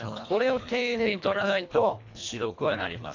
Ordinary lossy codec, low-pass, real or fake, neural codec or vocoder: AAC, 48 kbps; 7.2 kHz; fake; codec, 24 kHz, 1.5 kbps, HILCodec